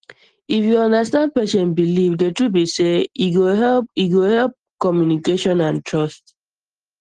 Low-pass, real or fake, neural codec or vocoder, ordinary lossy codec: 9.9 kHz; real; none; Opus, 16 kbps